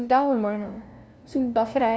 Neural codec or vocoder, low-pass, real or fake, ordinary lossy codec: codec, 16 kHz, 0.5 kbps, FunCodec, trained on LibriTTS, 25 frames a second; none; fake; none